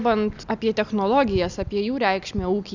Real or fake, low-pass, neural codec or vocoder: real; 7.2 kHz; none